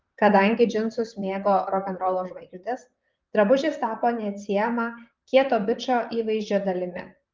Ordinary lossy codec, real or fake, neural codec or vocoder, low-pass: Opus, 32 kbps; fake; vocoder, 44.1 kHz, 128 mel bands, Pupu-Vocoder; 7.2 kHz